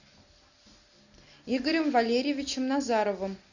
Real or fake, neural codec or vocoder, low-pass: real; none; 7.2 kHz